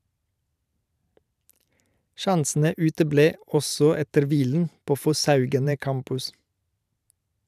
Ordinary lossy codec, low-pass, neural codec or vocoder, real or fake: none; 14.4 kHz; vocoder, 44.1 kHz, 128 mel bands every 512 samples, BigVGAN v2; fake